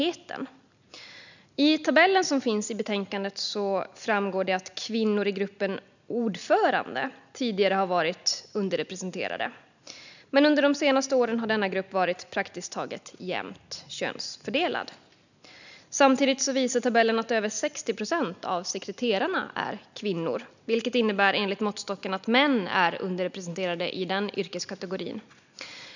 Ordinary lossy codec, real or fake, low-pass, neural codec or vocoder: none; real; 7.2 kHz; none